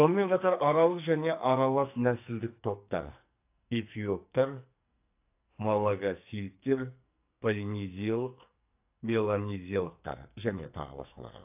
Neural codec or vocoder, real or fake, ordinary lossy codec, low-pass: codec, 44.1 kHz, 2.6 kbps, SNAC; fake; AAC, 32 kbps; 3.6 kHz